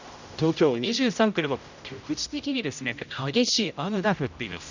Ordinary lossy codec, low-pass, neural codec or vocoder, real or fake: none; 7.2 kHz; codec, 16 kHz, 0.5 kbps, X-Codec, HuBERT features, trained on general audio; fake